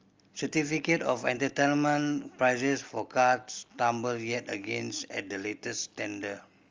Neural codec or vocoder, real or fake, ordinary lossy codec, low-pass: none; real; Opus, 32 kbps; 7.2 kHz